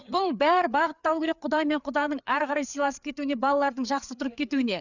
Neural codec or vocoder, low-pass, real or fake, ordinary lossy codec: codec, 16 kHz, 4 kbps, FreqCodec, larger model; 7.2 kHz; fake; none